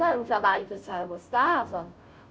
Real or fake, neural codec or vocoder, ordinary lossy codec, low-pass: fake; codec, 16 kHz, 0.5 kbps, FunCodec, trained on Chinese and English, 25 frames a second; none; none